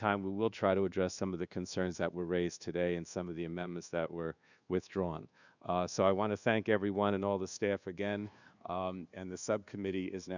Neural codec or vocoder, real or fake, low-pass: codec, 24 kHz, 1.2 kbps, DualCodec; fake; 7.2 kHz